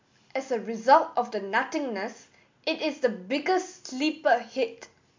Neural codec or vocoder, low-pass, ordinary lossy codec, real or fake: none; 7.2 kHz; MP3, 64 kbps; real